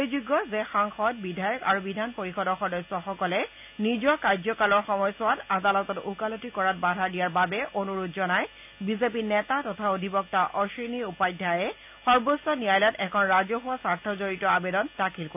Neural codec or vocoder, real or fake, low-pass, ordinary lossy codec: none; real; 3.6 kHz; none